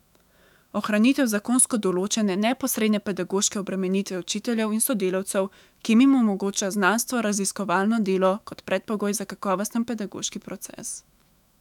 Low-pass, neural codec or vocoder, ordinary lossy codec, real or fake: 19.8 kHz; autoencoder, 48 kHz, 128 numbers a frame, DAC-VAE, trained on Japanese speech; none; fake